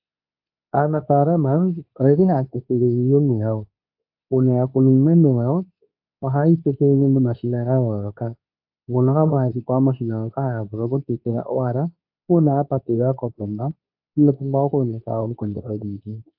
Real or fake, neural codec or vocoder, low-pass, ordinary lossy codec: fake; codec, 24 kHz, 0.9 kbps, WavTokenizer, medium speech release version 2; 5.4 kHz; AAC, 48 kbps